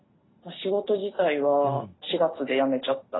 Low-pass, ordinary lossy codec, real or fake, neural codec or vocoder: 7.2 kHz; AAC, 16 kbps; real; none